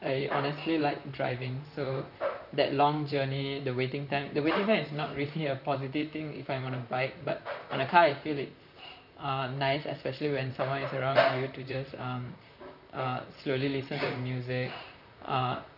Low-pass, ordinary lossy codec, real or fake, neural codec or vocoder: 5.4 kHz; none; fake; vocoder, 44.1 kHz, 128 mel bands, Pupu-Vocoder